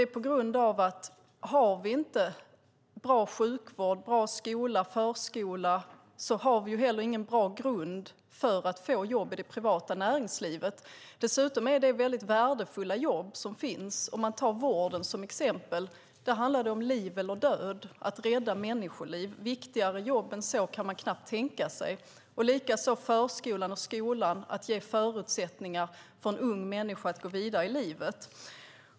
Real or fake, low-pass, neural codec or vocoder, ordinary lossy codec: real; none; none; none